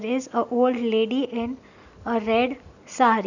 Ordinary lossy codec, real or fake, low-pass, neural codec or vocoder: none; real; 7.2 kHz; none